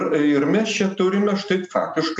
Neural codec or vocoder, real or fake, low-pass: none; real; 10.8 kHz